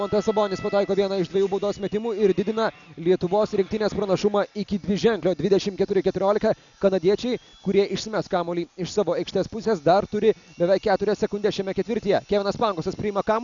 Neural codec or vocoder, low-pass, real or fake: none; 7.2 kHz; real